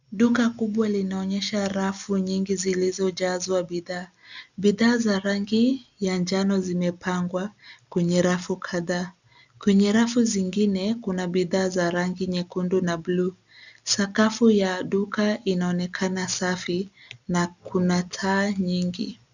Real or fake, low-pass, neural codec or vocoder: real; 7.2 kHz; none